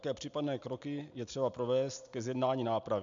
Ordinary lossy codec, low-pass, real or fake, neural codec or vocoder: MP3, 64 kbps; 7.2 kHz; real; none